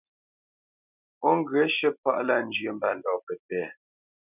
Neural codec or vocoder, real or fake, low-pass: none; real; 3.6 kHz